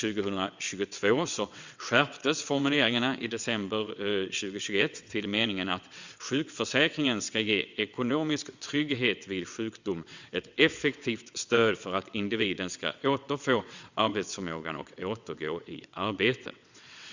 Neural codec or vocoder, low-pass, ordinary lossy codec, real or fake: vocoder, 22.05 kHz, 80 mel bands, WaveNeXt; 7.2 kHz; Opus, 64 kbps; fake